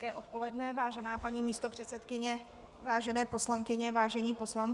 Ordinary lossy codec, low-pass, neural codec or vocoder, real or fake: Opus, 64 kbps; 10.8 kHz; codec, 32 kHz, 1.9 kbps, SNAC; fake